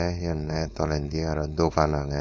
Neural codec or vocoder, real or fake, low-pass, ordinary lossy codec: codec, 16 kHz, 4.8 kbps, FACodec; fake; none; none